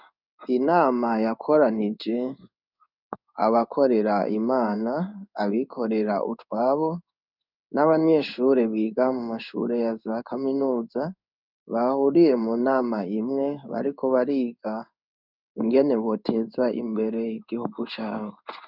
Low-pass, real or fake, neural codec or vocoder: 5.4 kHz; fake; codec, 16 kHz in and 24 kHz out, 1 kbps, XY-Tokenizer